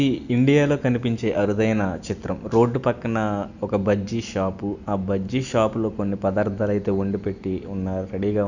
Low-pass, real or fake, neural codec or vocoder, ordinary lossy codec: 7.2 kHz; real; none; none